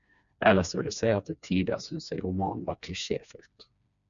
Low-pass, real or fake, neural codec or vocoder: 7.2 kHz; fake; codec, 16 kHz, 2 kbps, FreqCodec, smaller model